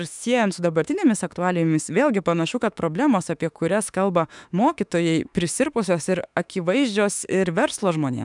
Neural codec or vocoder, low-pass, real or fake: autoencoder, 48 kHz, 32 numbers a frame, DAC-VAE, trained on Japanese speech; 10.8 kHz; fake